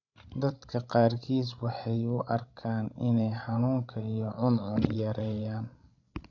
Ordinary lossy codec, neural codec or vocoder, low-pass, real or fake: none; codec, 16 kHz, 16 kbps, FreqCodec, larger model; 7.2 kHz; fake